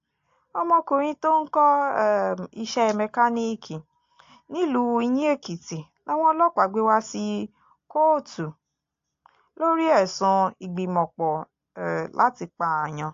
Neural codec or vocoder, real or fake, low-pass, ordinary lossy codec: none; real; 7.2 kHz; AAC, 48 kbps